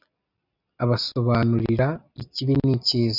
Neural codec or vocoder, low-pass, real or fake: none; 5.4 kHz; real